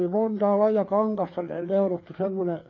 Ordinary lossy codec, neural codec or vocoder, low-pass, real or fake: AAC, 32 kbps; codec, 16 kHz, 4 kbps, FreqCodec, larger model; 7.2 kHz; fake